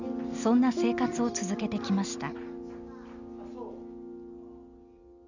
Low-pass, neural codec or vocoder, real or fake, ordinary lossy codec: 7.2 kHz; none; real; none